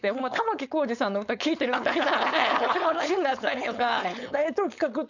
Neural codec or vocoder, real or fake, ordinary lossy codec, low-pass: codec, 16 kHz, 4.8 kbps, FACodec; fake; none; 7.2 kHz